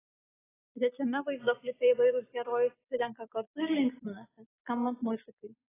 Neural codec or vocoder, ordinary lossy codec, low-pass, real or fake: none; AAC, 16 kbps; 3.6 kHz; real